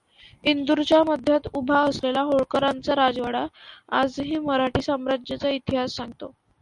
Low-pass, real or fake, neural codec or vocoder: 10.8 kHz; real; none